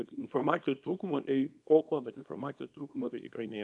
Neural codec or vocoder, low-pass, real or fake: codec, 24 kHz, 0.9 kbps, WavTokenizer, small release; 10.8 kHz; fake